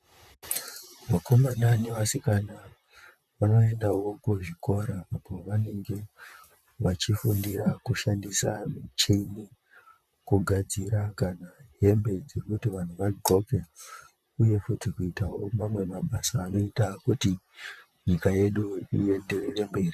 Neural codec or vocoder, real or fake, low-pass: vocoder, 44.1 kHz, 128 mel bands, Pupu-Vocoder; fake; 14.4 kHz